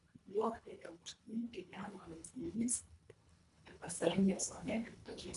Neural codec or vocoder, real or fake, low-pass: codec, 24 kHz, 1.5 kbps, HILCodec; fake; 10.8 kHz